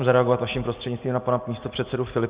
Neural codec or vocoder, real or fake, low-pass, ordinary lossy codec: none; real; 3.6 kHz; Opus, 64 kbps